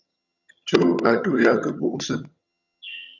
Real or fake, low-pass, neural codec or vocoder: fake; 7.2 kHz; vocoder, 22.05 kHz, 80 mel bands, HiFi-GAN